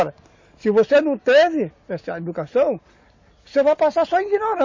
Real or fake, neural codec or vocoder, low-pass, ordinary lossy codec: real; none; 7.2 kHz; MP3, 32 kbps